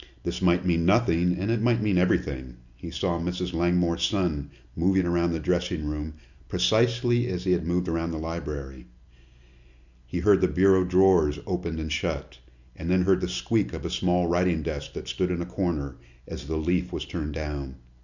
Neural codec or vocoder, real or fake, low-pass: none; real; 7.2 kHz